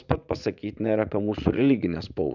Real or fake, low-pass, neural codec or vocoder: fake; 7.2 kHz; vocoder, 44.1 kHz, 80 mel bands, Vocos